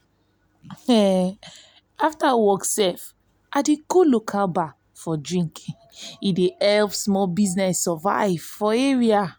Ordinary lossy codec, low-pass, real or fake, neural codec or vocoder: none; none; real; none